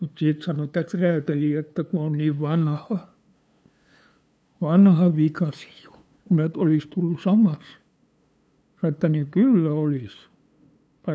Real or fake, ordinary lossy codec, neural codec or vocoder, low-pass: fake; none; codec, 16 kHz, 2 kbps, FunCodec, trained on LibriTTS, 25 frames a second; none